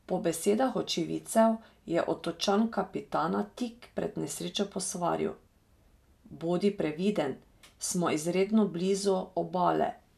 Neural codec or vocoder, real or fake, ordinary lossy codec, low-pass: none; real; none; 14.4 kHz